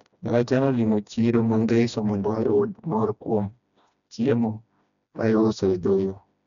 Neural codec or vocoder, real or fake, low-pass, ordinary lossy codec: codec, 16 kHz, 1 kbps, FreqCodec, smaller model; fake; 7.2 kHz; none